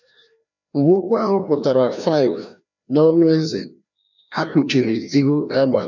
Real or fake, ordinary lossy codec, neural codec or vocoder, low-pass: fake; none; codec, 16 kHz, 1 kbps, FreqCodec, larger model; 7.2 kHz